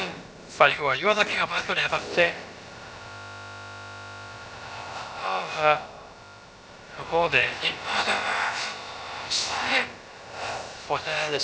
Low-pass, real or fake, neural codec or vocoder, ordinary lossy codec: none; fake; codec, 16 kHz, about 1 kbps, DyCAST, with the encoder's durations; none